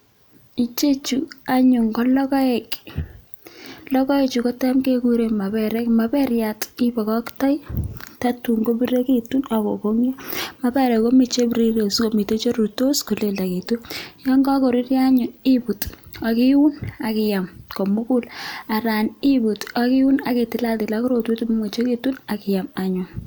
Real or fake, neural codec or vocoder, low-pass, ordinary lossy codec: real; none; none; none